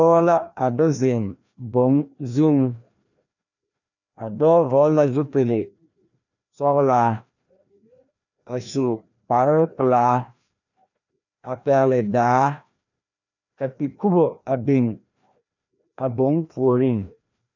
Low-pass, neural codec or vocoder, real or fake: 7.2 kHz; codec, 16 kHz, 1 kbps, FreqCodec, larger model; fake